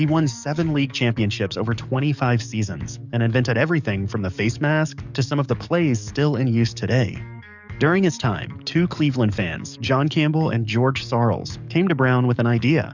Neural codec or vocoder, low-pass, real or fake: codec, 44.1 kHz, 7.8 kbps, DAC; 7.2 kHz; fake